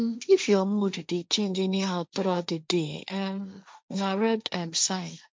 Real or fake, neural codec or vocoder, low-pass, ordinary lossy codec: fake; codec, 16 kHz, 1.1 kbps, Voila-Tokenizer; none; none